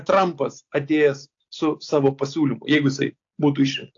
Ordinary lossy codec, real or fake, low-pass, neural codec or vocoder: AAC, 48 kbps; real; 7.2 kHz; none